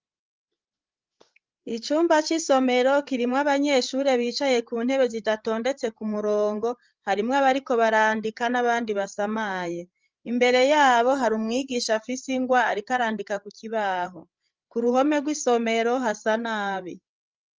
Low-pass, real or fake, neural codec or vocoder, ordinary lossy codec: 7.2 kHz; fake; codec, 16 kHz, 8 kbps, FreqCodec, larger model; Opus, 24 kbps